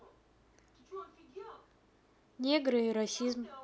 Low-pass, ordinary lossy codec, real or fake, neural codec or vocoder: none; none; real; none